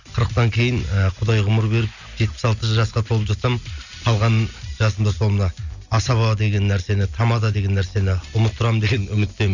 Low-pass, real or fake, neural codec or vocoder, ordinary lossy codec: 7.2 kHz; real; none; none